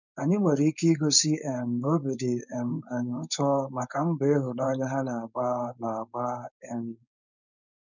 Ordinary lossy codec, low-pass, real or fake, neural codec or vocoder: none; 7.2 kHz; fake; codec, 16 kHz, 4.8 kbps, FACodec